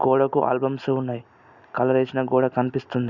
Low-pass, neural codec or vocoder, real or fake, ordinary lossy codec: 7.2 kHz; none; real; none